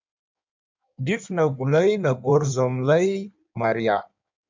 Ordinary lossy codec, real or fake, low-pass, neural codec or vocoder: MP3, 64 kbps; fake; 7.2 kHz; codec, 16 kHz in and 24 kHz out, 1.1 kbps, FireRedTTS-2 codec